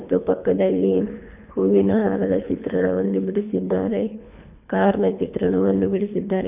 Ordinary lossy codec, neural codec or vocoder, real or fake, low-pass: none; codec, 24 kHz, 3 kbps, HILCodec; fake; 3.6 kHz